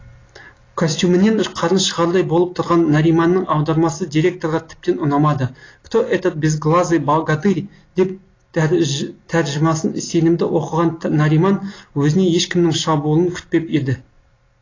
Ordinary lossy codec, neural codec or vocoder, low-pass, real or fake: AAC, 32 kbps; none; 7.2 kHz; real